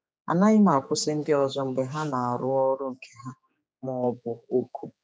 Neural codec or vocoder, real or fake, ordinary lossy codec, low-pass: codec, 16 kHz, 4 kbps, X-Codec, HuBERT features, trained on general audio; fake; none; none